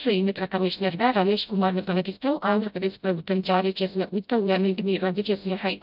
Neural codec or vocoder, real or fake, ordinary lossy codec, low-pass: codec, 16 kHz, 0.5 kbps, FreqCodec, smaller model; fake; none; 5.4 kHz